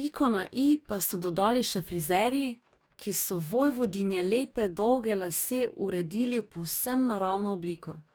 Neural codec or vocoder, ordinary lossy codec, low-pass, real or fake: codec, 44.1 kHz, 2.6 kbps, DAC; none; none; fake